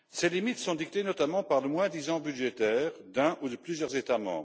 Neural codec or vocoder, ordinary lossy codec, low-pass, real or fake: none; none; none; real